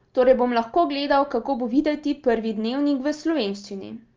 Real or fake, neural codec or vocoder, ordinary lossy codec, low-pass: real; none; Opus, 24 kbps; 7.2 kHz